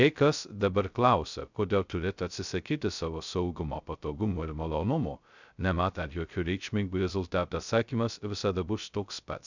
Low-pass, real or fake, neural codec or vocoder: 7.2 kHz; fake; codec, 16 kHz, 0.2 kbps, FocalCodec